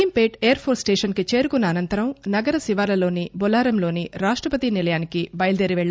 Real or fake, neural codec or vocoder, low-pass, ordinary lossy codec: real; none; none; none